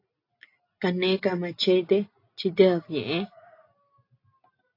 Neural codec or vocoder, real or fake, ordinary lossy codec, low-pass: none; real; AAC, 32 kbps; 5.4 kHz